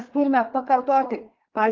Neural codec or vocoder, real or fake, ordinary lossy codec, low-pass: codec, 24 kHz, 1 kbps, SNAC; fake; Opus, 16 kbps; 7.2 kHz